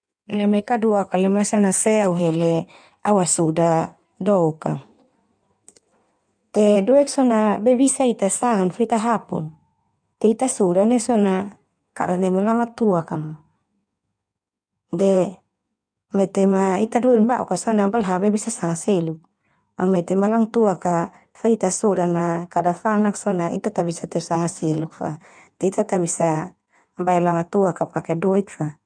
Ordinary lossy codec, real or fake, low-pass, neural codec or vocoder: none; fake; 9.9 kHz; codec, 16 kHz in and 24 kHz out, 1.1 kbps, FireRedTTS-2 codec